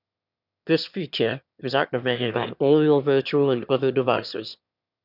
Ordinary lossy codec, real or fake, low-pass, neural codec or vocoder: none; fake; 5.4 kHz; autoencoder, 22.05 kHz, a latent of 192 numbers a frame, VITS, trained on one speaker